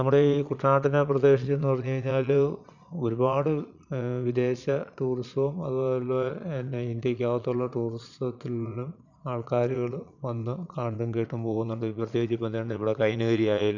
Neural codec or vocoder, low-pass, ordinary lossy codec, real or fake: vocoder, 22.05 kHz, 80 mel bands, Vocos; 7.2 kHz; none; fake